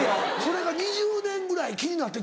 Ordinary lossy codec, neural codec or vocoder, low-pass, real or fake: none; none; none; real